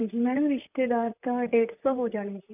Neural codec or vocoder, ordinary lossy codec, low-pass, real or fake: vocoder, 44.1 kHz, 128 mel bands, Pupu-Vocoder; none; 3.6 kHz; fake